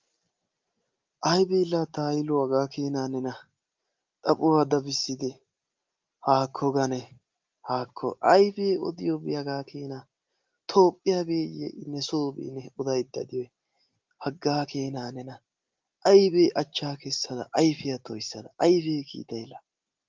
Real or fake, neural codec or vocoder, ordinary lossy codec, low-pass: real; none; Opus, 32 kbps; 7.2 kHz